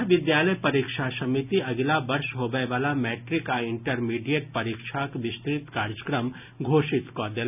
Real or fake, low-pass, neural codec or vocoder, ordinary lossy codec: real; 3.6 kHz; none; none